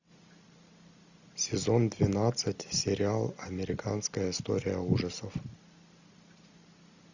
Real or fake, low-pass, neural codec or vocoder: real; 7.2 kHz; none